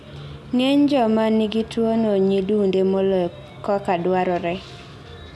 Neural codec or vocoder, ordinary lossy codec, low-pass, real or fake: none; none; none; real